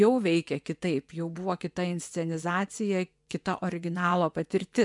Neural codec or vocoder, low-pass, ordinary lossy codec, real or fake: vocoder, 24 kHz, 100 mel bands, Vocos; 10.8 kHz; MP3, 96 kbps; fake